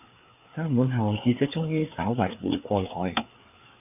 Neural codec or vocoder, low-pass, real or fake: codec, 16 kHz, 4 kbps, FreqCodec, larger model; 3.6 kHz; fake